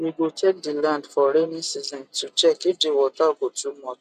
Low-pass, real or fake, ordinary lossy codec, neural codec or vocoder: 14.4 kHz; real; none; none